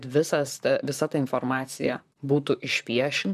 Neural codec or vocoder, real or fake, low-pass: vocoder, 44.1 kHz, 128 mel bands, Pupu-Vocoder; fake; 14.4 kHz